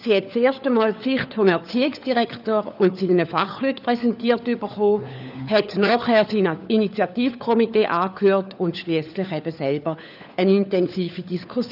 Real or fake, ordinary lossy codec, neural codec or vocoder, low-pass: fake; none; codec, 16 kHz, 4 kbps, FunCodec, trained on LibriTTS, 50 frames a second; 5.4 kHz